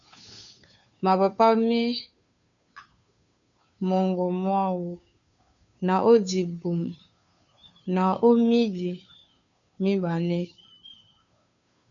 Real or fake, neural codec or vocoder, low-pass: fake; codec, 16 kHz, 2 kbps, FunCodec, trained on Chinese and English, 25 frames a second; 7.2 kHz